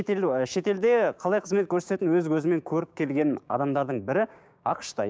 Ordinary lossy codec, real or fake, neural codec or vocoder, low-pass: none; fake; codec, 16 kHz, 6 kbps, DAC; none